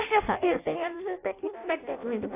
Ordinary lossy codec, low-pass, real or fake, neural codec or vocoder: AAC, 24 kbps; 3.6 kHz; fake; codec, 16 kHz in and 24 kHz out, 0.6 kbps, FireRedTTS-2 codec